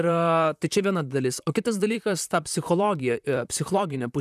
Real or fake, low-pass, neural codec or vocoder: fake; 14.4 kHz; vocoder, 44.1 kHz, 128 mel bands, Pupu-Vocoder